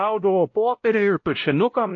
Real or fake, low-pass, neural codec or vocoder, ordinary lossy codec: fake; 7.2 kHz; codec, 16 kHz, 0.5 kbps, X-Codec, WavLM features, trained on Multilingual LibriSpeech; AAC, 48 kbps